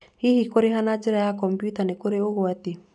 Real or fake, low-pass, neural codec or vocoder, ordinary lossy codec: real; 10.8 kHz; none; none